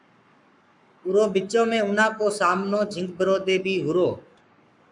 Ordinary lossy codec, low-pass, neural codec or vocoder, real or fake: MP3, 96 kbps; 10.8 kHz; codec, 44.1 kHz, 7.8 kbps, Pupu-Codec; fake